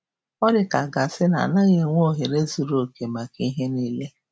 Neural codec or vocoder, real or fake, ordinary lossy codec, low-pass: none; real; none; none